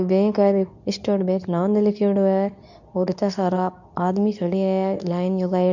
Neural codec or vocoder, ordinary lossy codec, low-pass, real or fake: codec, 24 kHz, 0.9 kbps, WavTokenizer, medium speech release version 2; none; 7.2 kHz; fake